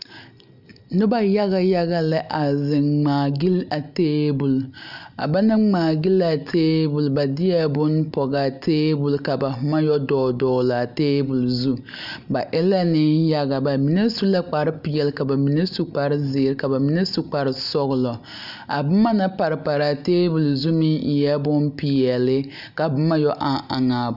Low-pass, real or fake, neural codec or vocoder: 5.4 kHz; real; none